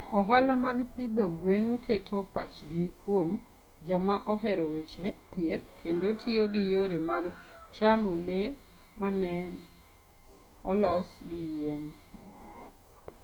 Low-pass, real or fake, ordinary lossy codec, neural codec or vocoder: 19.8 kHz; fake; none; codec, 44.1 kHz, 2.6 kbps, DAC